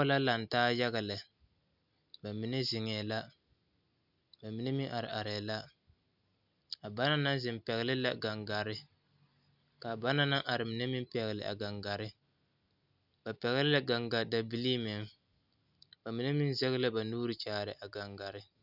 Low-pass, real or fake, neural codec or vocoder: 5.4 kHz; real; none